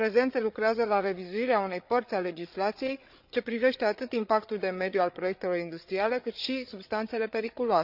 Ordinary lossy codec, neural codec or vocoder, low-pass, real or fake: none; codec, 16 kHz, 8 kbps, FreqCodec, larger model; 5.4 kHz; fake